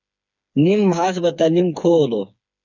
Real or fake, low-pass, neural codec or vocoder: fake; 7.2 kHz; codec, 16 kHz, 4 kbps, FreqCodec, smaller model